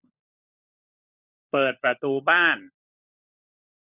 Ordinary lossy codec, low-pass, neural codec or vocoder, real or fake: none; 3.6 kHz; codec, 16 kHz, 16 kbps, FunCodec, trained on LibriTTS, 50 frames a second; fake